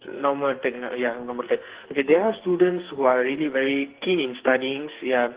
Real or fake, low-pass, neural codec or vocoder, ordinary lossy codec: fake; 3.6 kHz; codec, 44.1 kHz, 2.6 kbps, SNAC; Opus, 16 kbps